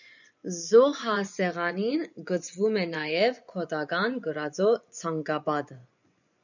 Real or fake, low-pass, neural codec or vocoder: fake; 7.2 kHz; vocoder, 24 kHz, 100 mel bands, Vocos